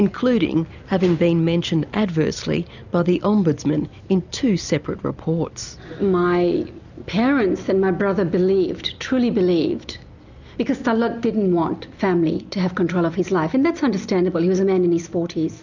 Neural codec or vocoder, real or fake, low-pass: none; real; 7.2 kHz